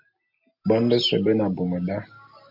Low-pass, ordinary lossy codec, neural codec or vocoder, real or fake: 5.4 kHz; MP3, 48 kbps; none; real